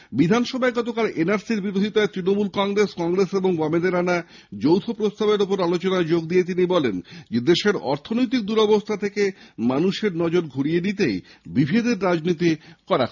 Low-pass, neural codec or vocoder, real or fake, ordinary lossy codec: 7.2 kHz; none; real; none